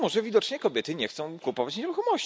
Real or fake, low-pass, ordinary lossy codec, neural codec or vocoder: real; none; none; none